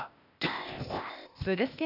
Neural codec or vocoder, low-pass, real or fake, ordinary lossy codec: codec, 16 kHz, 0.8 kbps, ZipCodec; 5.4 kHz; fake; none